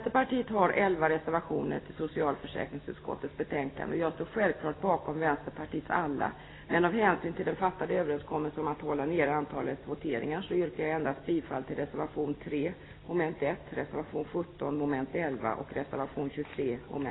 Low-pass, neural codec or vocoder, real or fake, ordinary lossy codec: 7.2 kHz; none; real; AAC, 16 kbps